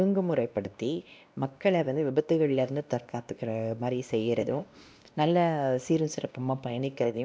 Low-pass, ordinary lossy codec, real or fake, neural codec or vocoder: none; none; fake; codec, 16 kHz, 1 kbps, X-Codec, WavLM features, trained on Multilingual LibriSpeech